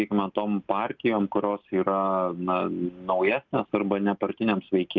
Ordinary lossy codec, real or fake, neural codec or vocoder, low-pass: Opus, 32 kbps; real; none; 7.2 kHz